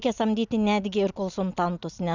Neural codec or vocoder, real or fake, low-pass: none; real; 7.2 kHz